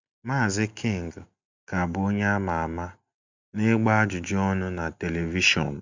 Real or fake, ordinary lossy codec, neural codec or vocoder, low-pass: real; MP3, 64 kbps; none; 7.2 kHz